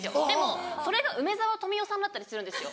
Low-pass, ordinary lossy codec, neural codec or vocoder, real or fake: none; none; none; real